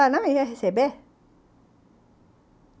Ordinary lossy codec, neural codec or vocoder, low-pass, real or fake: none; none; none; real